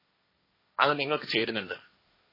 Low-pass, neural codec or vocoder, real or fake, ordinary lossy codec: 5.4 kHz; codec, 16 kHz, 1.1 kbps, Voila-Tokenizer; fake; MP3, 24 kbps